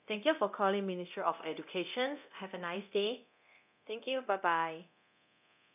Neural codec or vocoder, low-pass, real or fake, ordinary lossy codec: codec, 24 kHz, 0.9 kbps, DualCodec; 3.6 kHz; fake; none